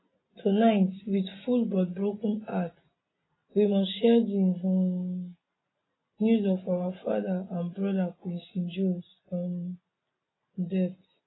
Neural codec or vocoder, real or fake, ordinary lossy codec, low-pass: none; real; AAC, 16 kbps; 7.2 kHz